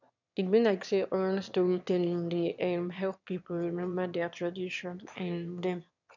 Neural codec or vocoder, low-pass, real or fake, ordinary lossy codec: autoencoder, 22.05 kHz, a latent of 192 numbers a frame, VITS, trained on one speaker; 7.2 kHz; fake; none